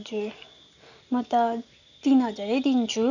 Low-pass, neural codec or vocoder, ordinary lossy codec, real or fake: 7.2 kHz; none; none; real